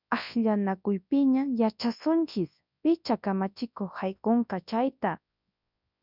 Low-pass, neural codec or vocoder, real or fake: 5.4 kHz; codec, 24 kHz, 0.9 kbps, WavTokenizer, large speech release; fake